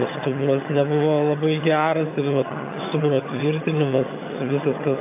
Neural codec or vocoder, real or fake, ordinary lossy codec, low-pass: vocoder, 22.05 kHz, 80 mel bands, HiFi-GAN; fake; AAC, 24 kbps; 3.6 kHz